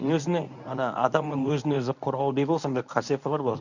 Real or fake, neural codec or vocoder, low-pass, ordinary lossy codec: fake; codec, 24 kHz, 0.9 kbps, WavTokenizer, medium speech release version 1; 7.2 kHz; none